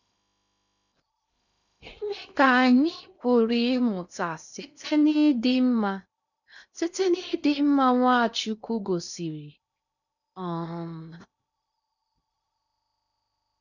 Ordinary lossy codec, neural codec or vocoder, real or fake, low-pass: none; codec, 16 kHz in and 24 kHz out, 0.8 kbps, FocalCodec, streaming, 65536 codes; fake; 7.2 kHz